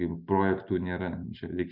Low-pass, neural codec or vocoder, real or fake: 5.4 kHz; none; real